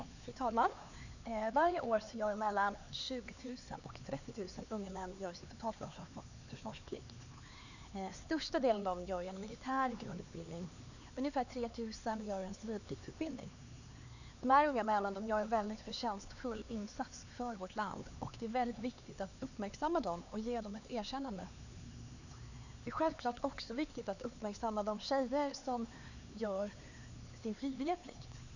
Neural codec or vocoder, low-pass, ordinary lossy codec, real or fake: codec, 16 kHz, 4 kbps, X-Codec, HuBERT features, trained on LibriSpeech; 7.2 kHz; none; fake